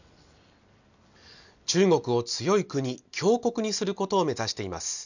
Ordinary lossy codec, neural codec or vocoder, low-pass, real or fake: none; none; 7.2 kHz; real